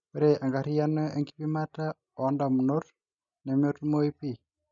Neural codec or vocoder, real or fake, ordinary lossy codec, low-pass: none; real; none; 7.2 kHz